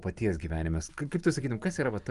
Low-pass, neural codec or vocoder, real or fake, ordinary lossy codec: 10.8 kHz; none; real; Opus, 32 kbps